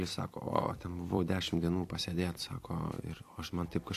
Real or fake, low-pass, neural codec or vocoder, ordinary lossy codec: fake; 14.4 kHz; vocoder, 44.1 kHz, 128 mel bands every 256 samples, BigVGAN v2; AAC, 64 kbps